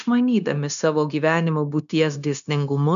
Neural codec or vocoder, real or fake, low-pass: codec, 16 kHz, 0.9 kbps, LongCat-Audio-Codec; fake; 7.2 kHz